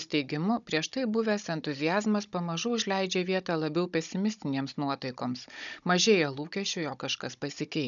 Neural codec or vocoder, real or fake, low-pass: codec, 16 kHz, 16 kbps, FunCodec, trained on Chinese and English, 50 frames a second; fake; 7.2 kHz